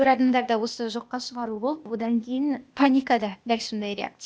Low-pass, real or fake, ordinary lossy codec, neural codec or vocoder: none; fake; none; codec, 16 kHz, 0.8 kbps, ZipCodec